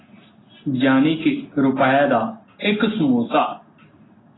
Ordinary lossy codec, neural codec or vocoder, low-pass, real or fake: AAC, 16 kbps; none; 7.2 kHz; real